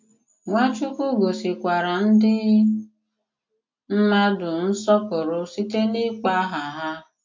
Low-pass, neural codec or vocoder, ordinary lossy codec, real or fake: 7.2 kHz; none; MP3, 48 kbps; real